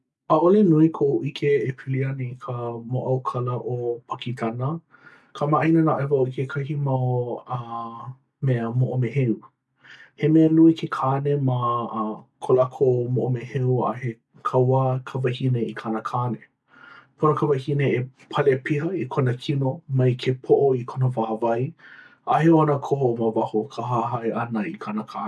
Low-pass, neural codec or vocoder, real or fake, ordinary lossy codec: none; none; real; none